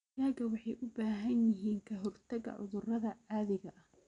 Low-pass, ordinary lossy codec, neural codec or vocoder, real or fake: 9.9 kHz; none; none; real